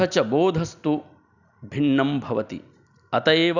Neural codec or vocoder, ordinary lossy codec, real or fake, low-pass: none; none; real; 7.2 kHz